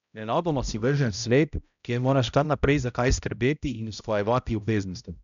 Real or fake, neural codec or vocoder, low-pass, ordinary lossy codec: fake; codec, 16 kHz, 0.5 kbps, X-Codec, HuBERT features, trained on balanced general audio; 7.2 kHz; none